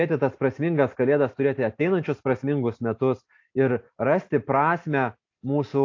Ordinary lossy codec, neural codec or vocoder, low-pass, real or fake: AAC, 48 kbps; none; 7.2 kHz; real